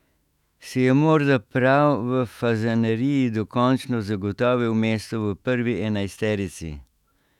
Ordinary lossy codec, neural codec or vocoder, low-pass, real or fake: none; autoencoder, 48 kHz, 128 numbers a frame, DAC-VAE, trained on Japanese speech; 19.8 kHz; fake